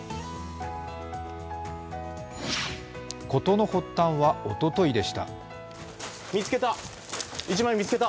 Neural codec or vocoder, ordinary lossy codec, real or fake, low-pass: none; none; real; none